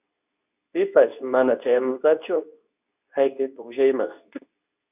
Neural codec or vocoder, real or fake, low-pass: codec, 24 kHz, 0.9 kbps, WavTokenizer, medium speech release version 2; fake; 3.6 kHz